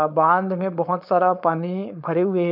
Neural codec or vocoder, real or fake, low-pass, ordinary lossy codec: codec, 16 kHz, 4.8 kbps, FACodec; fake; 5.4 kHz; none